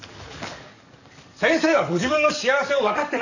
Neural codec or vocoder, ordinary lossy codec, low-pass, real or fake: codec, 44.1 kHz, 7.8 kbps, DAC; none; 7.2 kHz; fake